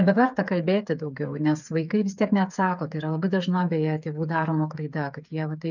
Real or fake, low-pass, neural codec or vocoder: fake; 7.2 kHz; codec, 16 kHz, 8 kbps, FreqCodec, smaller model